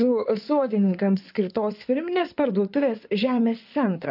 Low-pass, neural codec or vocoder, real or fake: 5.4 kHz; codec, 16 kHz in and 24 kHz out, 2.2 kbps, FireRedTTS-2 codec; fake